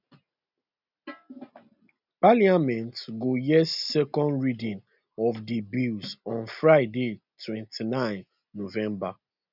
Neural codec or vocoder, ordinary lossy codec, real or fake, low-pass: none; none; real; 5.4 kHz